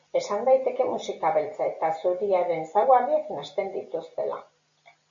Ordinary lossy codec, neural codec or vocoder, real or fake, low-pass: MP3, 64 kbps; none; real; 7.2 kHz